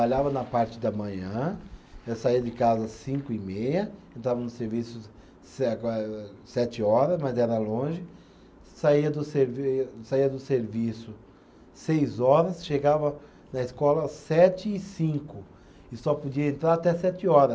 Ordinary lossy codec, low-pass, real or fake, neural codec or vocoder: none; none; real; none